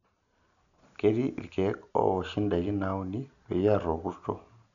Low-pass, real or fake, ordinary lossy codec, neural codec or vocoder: 7.2 kHz; real; none; none